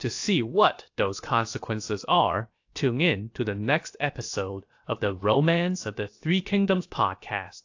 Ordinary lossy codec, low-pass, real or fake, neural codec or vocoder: AAC, 48 kbps; 7.2 kHz; fake; codec, 16 kHz, about 1 kbps, DyCAST, with the encoder's durations